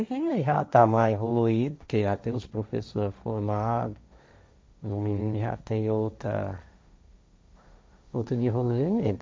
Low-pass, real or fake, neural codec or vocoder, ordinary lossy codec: none; fake; codec, 16 kHz, 1.1 kbps, Voila-Tokenizer; none